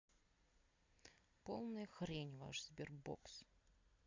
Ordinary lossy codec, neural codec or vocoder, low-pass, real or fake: MP3, 64 kbps; none; 7.2 kHz; real